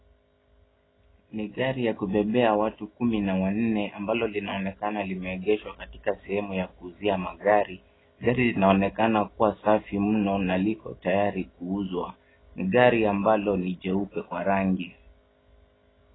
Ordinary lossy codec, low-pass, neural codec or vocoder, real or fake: AAC, 16 kbps; 7.2 kHz; none; real